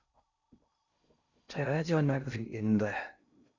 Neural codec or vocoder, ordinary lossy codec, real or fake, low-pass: codec, 16 kHz in and 24 kHz out, 0.6 kbps, FocalCodec, streaming, 4096 codes; Opus, 64 kbps; fake; 7.2 kHz